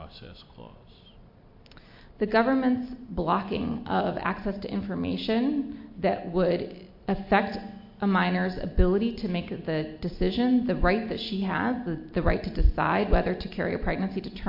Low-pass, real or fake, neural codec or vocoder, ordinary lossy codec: 5.4 kHz; real; none; MP3, 32 kbps